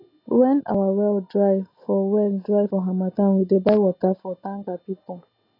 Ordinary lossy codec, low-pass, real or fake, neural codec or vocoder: AAC, 24 kbps; 5.4 kHz; real; none